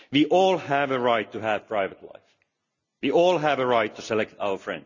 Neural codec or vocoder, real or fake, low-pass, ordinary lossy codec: none; real; 7.2 kHz; none